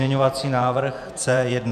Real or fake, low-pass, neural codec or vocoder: fake; 14.4 kHz; vocoder, 48 kHz, 128 mel bands, Vocos